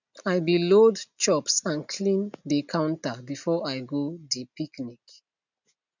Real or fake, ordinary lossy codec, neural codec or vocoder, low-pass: real; none; none; 7.2 kHz